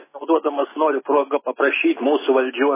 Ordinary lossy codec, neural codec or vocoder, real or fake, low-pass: MP3, 16 kbps; none; real; 3.6 kHz